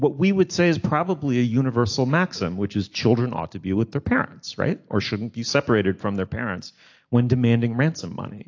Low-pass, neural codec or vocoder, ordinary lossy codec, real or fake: 7.2 kHz; none; AAC, 48 kbps; real